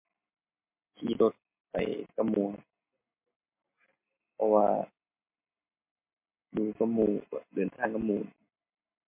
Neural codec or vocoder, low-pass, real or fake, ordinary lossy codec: none; 3.6 kHz; real; MP3, 24 kbps